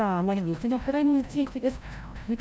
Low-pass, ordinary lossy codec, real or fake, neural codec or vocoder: none; none; fake; codec, 16 kHz, 0.5 kbps, FreqCodec, larger model